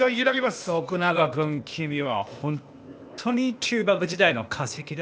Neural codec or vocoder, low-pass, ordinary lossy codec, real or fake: codec, 16 kHz, 0.8 kbps, ZipCodec; none; none; fake